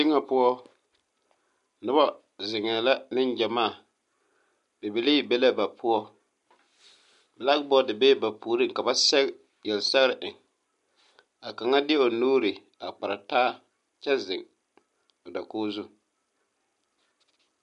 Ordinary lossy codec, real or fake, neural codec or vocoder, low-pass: MP3, 64 kbps; real; none; 10.8 kHz